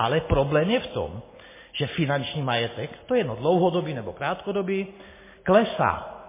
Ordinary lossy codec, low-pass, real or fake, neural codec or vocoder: MP3, 16 kbps; 3.6 kHz; real; none